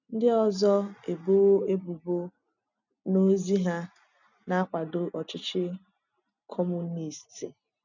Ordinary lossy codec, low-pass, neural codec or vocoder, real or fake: none; 7.2 kHz; none; real